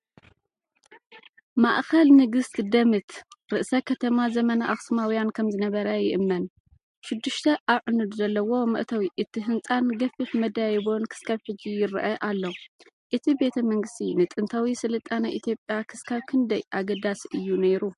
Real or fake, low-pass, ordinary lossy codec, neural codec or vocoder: real; 14.4 kHz; MP3, 48 kbps; none